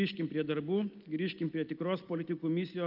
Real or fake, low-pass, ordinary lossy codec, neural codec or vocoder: real; 5.4 kHz; Opus, 24 kbps; none